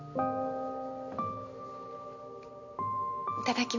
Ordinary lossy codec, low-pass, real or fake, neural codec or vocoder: none; 7.2 kHz; real; none